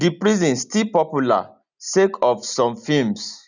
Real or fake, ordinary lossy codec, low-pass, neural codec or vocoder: real; none; 7.2 kHz; none